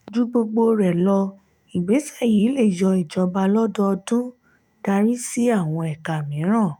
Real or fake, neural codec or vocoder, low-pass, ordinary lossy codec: fake; autoencoder, 48 kHz, 128 numbers a frame, DAC-VAE, trained on Japanese speech; 19.8 kHz; none